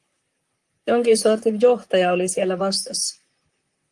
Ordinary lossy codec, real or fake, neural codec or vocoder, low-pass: Opus, 24 kbps; fake; vocoder, 44.1 kHz, 128 mel bands, Pupu-Vocoder; 10.8 kHz